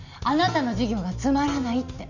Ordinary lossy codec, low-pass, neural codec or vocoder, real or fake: none; 7.2 kHz; none; real